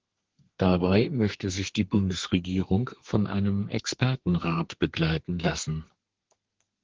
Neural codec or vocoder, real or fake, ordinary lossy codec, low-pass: codec, 32 kHz, 1.9 kbps, SNAC; fake; Opus, 16 kbps; 7.2 kHz